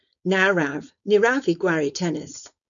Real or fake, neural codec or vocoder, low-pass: fake; codec, 16 kHz, 4.8 kbps, FACodec; 7.2 kHz